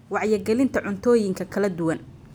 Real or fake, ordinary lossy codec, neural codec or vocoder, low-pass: real; none; none; none